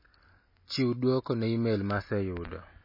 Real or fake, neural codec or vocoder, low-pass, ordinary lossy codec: real; none; 5.4 kHz; MP3, 24 kbps